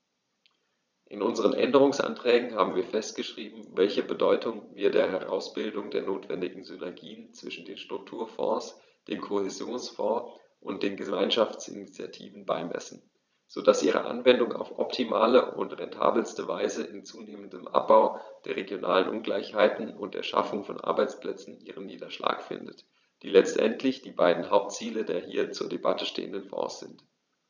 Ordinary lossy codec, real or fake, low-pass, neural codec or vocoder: none; fake; 7.2 kHz; vocoder, 22.05 kHz, 80 mel bands, Vocos